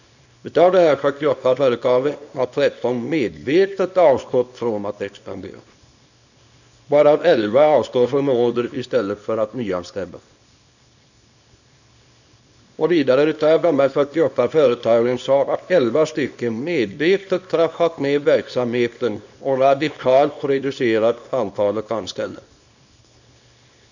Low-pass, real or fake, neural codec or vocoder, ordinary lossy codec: 7.2 kHz; fake; codec, 24 kHz, 0.9 kbps, WavTokenizer, small release; none